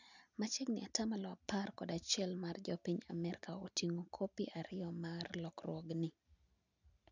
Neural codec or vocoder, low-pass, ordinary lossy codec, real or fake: none; 7.2 kHz; none; real